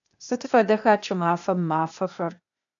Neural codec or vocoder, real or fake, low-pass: codec, 16 kHz, 0.8 kbps, ZipCodec; fake; 7.2 kHz